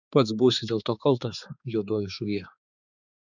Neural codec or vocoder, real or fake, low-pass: codec, 16 kHz, 4 kbps, X-Codec, HuBERT features, trained on balanced general audio; fake; 7.2 kHz